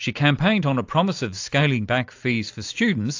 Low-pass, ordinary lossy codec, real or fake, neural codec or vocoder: 7.2 kHz; AAC, 48 kbps; real; none